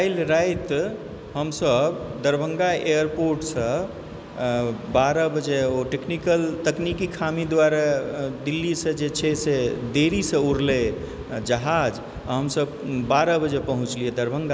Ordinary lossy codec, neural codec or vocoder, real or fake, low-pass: none; none; real; none